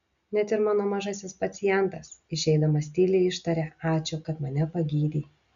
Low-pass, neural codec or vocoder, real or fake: 7.2 kHz; none; real